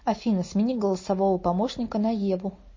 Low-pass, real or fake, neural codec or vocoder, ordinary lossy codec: 7.2 kHz; real; none; MP3, 32 kbps